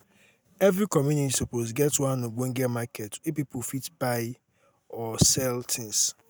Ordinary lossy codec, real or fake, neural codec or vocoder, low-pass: none; real; none; none